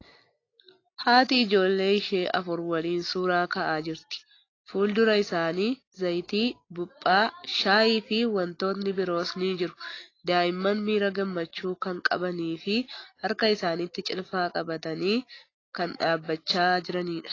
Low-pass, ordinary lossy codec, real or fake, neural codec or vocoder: 7.2 kHz; AAC, 32 kbps; real; none